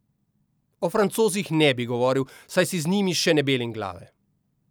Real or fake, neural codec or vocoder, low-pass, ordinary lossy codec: real; none; none; none